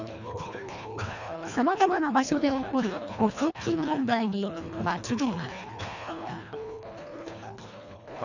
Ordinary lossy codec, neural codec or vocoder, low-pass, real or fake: none; codec, 24 kHz, 1.5 kbps, HILCodec; 7.2 kHz; fake